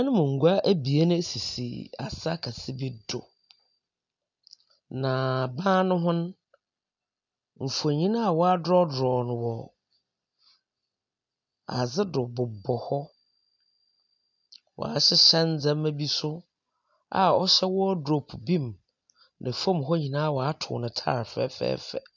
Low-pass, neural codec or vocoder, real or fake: 7.2 kHz; none; real